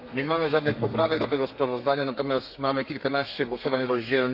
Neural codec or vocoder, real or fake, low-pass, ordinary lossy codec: codec, 32 kHz, 1.9 kbps, SNAC; fake; 5.4 kHz; none